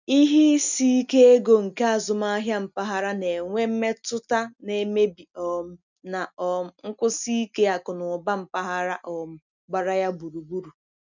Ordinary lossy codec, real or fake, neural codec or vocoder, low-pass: none; real; none; 7.2 kHz